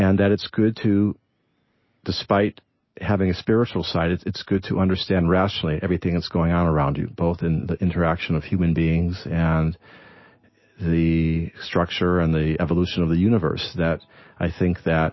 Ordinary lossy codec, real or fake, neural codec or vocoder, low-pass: MP3, 24 kbps; real; none; 7.2 kHz